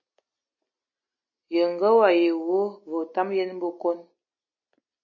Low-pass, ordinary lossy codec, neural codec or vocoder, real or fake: 7.2 kHz; MP3, 32 kbps; none; real